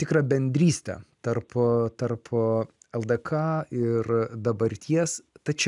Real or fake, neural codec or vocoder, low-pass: real; none; 10.8 kHz